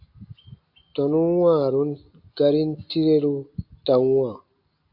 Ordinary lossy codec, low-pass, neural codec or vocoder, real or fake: AAC, 48 kbps; 5.4 kHz; none; real